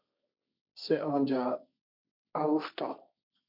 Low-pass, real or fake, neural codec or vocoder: 5.4 kHz; fake; codec, 16 kHz, 1.1 kbps, Voila-Tokenizer